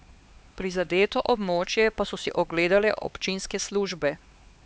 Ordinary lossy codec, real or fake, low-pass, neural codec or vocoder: none; fake; none; codec, 16 kHz, 4 kbps, X-Codec, HuBERT features, trained on LibriSpeech